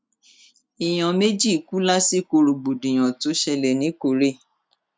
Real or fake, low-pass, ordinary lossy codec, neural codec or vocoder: real; none; none; none